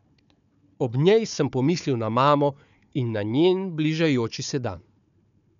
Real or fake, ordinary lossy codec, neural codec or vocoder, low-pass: fake; none; codec, 16 kHz, 4 kbps, FunCodec, trained on Chinese and English, 50 frames a second; 7.2 kHz